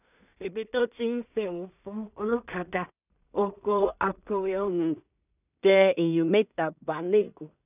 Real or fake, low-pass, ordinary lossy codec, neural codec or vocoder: fake; 3.6 kHz; none; codec, 16 kHz in and 24 kHz out, 0.4 kbps, LongCat-Audio-Codec, two codebook decoder